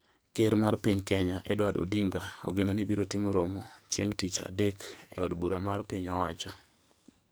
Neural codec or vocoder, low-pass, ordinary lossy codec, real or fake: codec, 44.1 kHz, 2.6 kbps, SNAC; none; none; fake